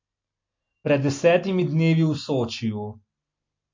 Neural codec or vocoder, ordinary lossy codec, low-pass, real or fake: none; MP3, 48 kbps; 7.2 kHz; real